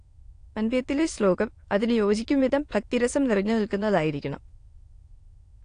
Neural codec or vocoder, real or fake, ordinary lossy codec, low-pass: autoencoder, 22.05 kHz, a latent of 192 numbers a frame, VITS, trained on many speakers; fake; AAC, 48 kbps; 9.9 kHz